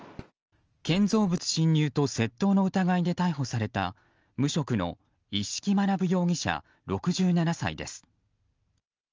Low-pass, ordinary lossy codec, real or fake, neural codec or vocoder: 7.2 kHz; Opus, 24 kbps; real; none